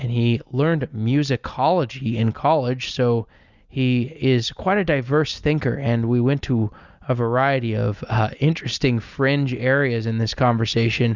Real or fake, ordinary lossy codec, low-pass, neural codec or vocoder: real; Opus, 64 kbps; 7.2 kHz; none